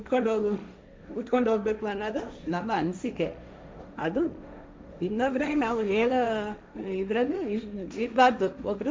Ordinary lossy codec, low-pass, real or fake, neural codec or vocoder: none; none; fake; codec, 16 kHz, 1.1 kbps, Voila-Tokenizer